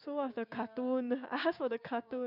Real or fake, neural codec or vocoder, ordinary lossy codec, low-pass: fake; codec, 16 kHz, 6 kbps, DAC; none; 5.4 kHz